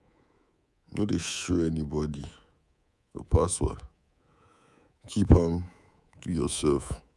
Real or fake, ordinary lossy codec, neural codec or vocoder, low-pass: fake; none; codec, 24 kHz, 3.1 kbps, DualCodec; none